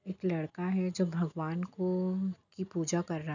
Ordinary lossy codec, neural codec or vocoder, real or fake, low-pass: none; none; real; 7.2 kHz